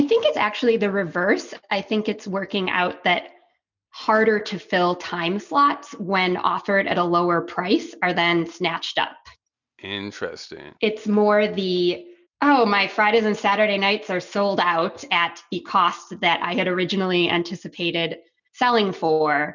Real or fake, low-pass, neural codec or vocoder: real; 7.2 kHz; none